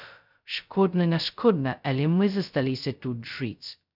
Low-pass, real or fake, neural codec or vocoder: 5.4 kHz; fake; codec, 16 kHz, 0.2 kbps, FocalCodec